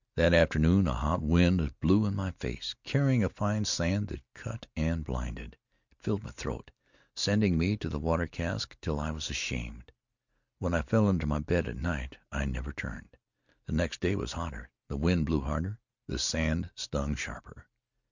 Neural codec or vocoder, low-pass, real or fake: none; 7.2 kHz; real